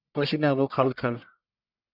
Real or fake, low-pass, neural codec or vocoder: fake; 5.4 kHz; codec, 44.1 kHz, 1.7 kbps, Pupu-Codec